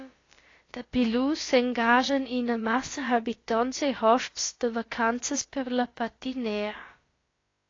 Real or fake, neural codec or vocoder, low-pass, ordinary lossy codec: fake; codec, 16 kHz, about 1 kbps, DyCAST, with the encoder's durations; 7.2 kHz; AAC, 32 kbps